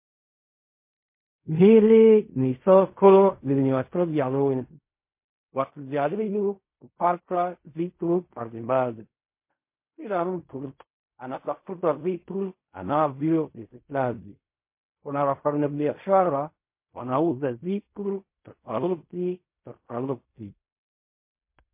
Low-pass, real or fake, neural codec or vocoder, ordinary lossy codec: 3.6 kHz; fake; codec, 16 kHz in and 24 kHz out, 0.4 kbps, LongCat-Audio-Codec, fine tuned four codebook decoder; MP3, 24 kbps